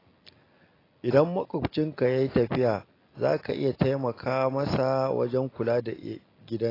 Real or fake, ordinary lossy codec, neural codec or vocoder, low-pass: real; AAC, 24 kbps; none; 5.4 kHz